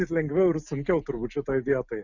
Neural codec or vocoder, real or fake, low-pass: none; real; 7.2 kHz